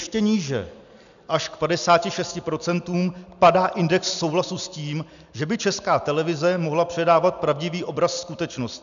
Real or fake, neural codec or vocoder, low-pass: real; none; 7.2 kHz